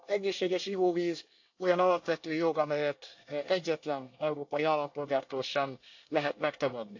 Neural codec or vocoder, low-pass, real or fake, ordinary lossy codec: codec, 24 kHz, 1 kbps, SNAC; 7.2 kHz; fake; AAC, 48 kbps